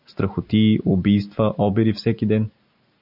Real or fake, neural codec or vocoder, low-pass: real; none; 5.4 kHz